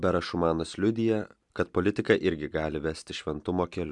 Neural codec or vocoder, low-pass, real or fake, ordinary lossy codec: none; 10.8 kHz; real; MP3, 96 kbps